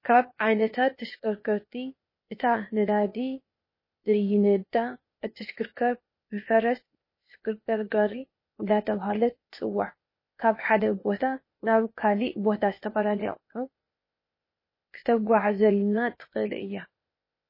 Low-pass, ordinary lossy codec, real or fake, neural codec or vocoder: 5.4 kHz; MP3, 24 kbps; fake; codec, 16 kHz, 0.8 kbps, ZipCodec